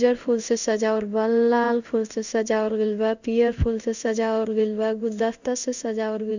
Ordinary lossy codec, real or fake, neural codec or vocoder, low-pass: none; fake; codec, 16 kHz in and 24 kHz out, 1 kbps, XY-Tokenizer; 7.2 kHz